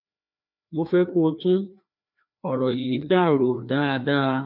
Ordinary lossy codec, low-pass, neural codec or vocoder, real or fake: none; 5.4 kHz; codec, 16 kHz, 2 kbps, FreqCodec, larger model; fake